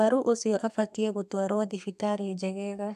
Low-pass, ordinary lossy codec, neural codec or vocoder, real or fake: 14.4 kHz; MP3, 96 kbps; codec, 32 kHz, 1.9 kbps, SNAC; fake